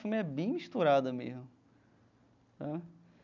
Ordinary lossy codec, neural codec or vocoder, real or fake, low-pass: none; none; real; 7.2 kHz